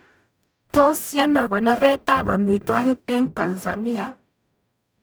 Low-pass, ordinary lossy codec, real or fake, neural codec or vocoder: none; none; fake; codec, 44.1 kHz, 0.9 kbps, DAC